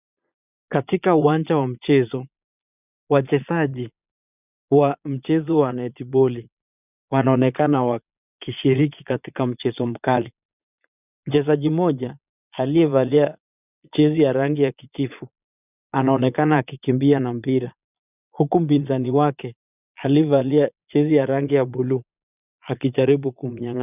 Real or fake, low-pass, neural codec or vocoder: fake; 3.6 kHz; vocoder, 22.05 kHz, 80 mel bands, Vocos